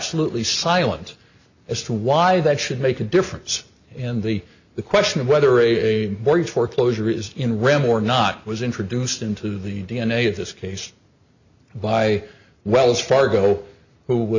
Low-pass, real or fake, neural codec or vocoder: 7.2 kHz; real; none